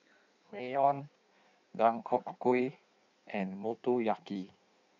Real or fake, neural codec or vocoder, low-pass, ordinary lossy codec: fake; codec, 16 kHz in and 24 kHz out, 1.1 kbps, FireRedTTS-2 codec; 7.2 kHz; none